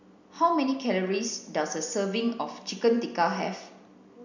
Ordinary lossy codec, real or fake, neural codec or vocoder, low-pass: none; real; none; 7.2 kHz